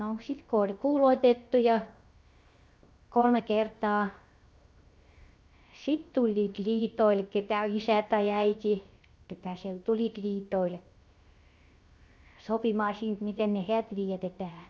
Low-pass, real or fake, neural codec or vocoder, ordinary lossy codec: none; fake; codec, 16 kHz, 0.7 kbps, FocalCodec; none